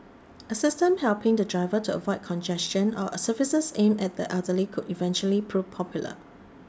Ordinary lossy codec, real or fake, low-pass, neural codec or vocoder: none; real; none; none